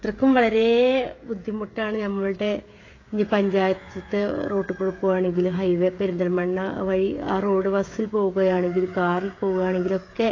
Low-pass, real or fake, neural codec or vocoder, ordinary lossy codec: 7.2 kHz; fake; codec, 16 kHz, 16 kbps, FreqCodec, smaller model; AAC, 32 kbps